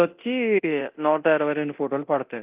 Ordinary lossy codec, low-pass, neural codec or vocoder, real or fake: Opus, 32 kbps; 3.6 kHz; codec, 24 kHz, 0.9 kbps, DualCodec; fake